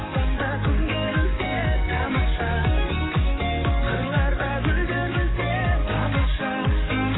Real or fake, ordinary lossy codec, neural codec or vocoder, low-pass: fake; AAC, 16 kbps; codec, 44.1 kHz, 7.8 kbps, DAC; 7.2 kHz